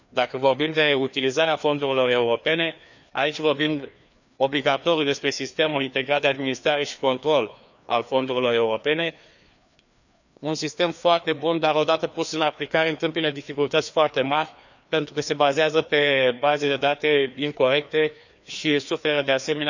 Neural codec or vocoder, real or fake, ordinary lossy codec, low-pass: codec, 16 kHz, 2 kbps, FreqCodec, larger model; fake; none; 7.2 kHz